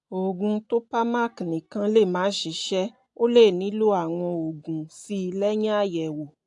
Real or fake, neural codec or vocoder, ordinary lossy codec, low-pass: real; none; AAC, 64 kbps; 10.8 kHz